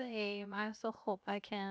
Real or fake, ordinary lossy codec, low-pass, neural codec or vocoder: fake; none; none; codec, 16 kHz, 0.7 kbps, FocalCodec